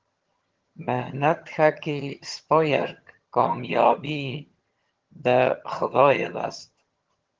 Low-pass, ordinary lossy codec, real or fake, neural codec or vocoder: 7.2 kHz; Opus, 16 kbps; fake; vocoder, 22.05 kHz, 80 mel bands, HiFi-GAN